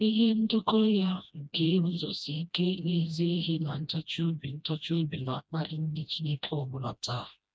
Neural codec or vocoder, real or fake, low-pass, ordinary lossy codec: codec, 16 kHz, 1 kbps, FreqCodec, smaller model; fake; none; none